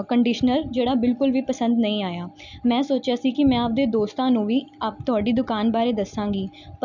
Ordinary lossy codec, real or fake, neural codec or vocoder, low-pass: none; real; none; 7.2 kHz